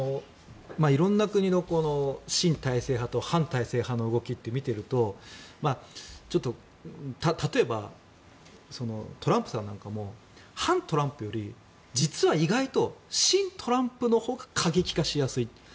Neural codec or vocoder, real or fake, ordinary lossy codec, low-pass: none; real; none; none